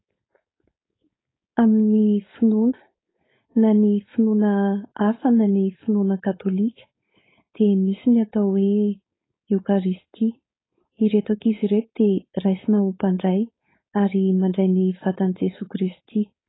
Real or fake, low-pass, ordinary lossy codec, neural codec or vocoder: fake; 7.2 kHz; AAC, 16 kbps; codec, 16 kHz, 4.8 kbps, FACodec